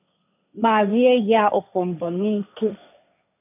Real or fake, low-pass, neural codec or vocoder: fake; 3.6 kHz; codec, 16 kHz, 1.1 kbps, Voila-Tokenizer